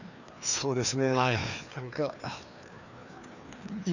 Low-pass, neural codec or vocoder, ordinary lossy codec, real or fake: 7.2 kHz; codec, 16 kHz, 2 kbps, FreqCodec, larger model; none; fake